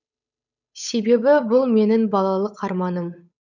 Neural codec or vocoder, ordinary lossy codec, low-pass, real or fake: codec, 16 kHz, 8 kbps, FunCodec, trained on Chinese and English, 25 frames a second; none; 7.2 kHz; fake